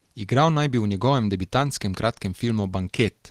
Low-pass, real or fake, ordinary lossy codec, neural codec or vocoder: 14.4 kHz; real; Opus, 16 kbps; none